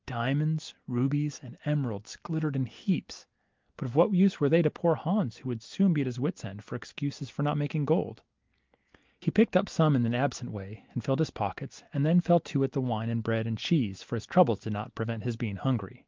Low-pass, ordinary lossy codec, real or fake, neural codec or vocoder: 7.2 kHz; Opus, 32 kbps; real; none